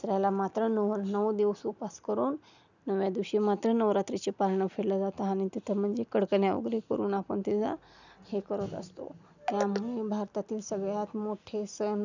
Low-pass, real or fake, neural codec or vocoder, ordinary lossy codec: 7.2 kHz; real; none; none